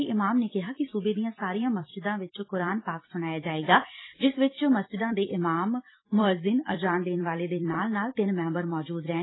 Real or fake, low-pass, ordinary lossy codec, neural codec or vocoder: fake; 7.2 kHz; AAC, 16 kbps; vocoder, 44.1 kHz, 128 mel bands every 256 samples, BigVGAN v2